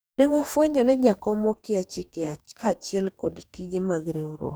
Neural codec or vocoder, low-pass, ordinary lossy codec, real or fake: codec, 44.1 kHz, 2.6 kbps, DAC; none; none; fake